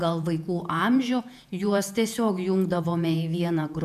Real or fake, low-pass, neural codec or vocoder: fake; 14.4 kHz; vocoder, 48 kHz, 128 mel bands, Vocos